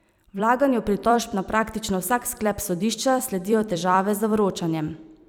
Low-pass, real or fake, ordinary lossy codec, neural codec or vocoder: none; fake; none; vocoder, 44.1 kHz, 128 mel bands every 256 samples, BigVGAN v2